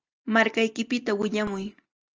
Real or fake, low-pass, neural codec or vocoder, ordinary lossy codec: real; 7.2 kHz; none; Opus, 24 kbps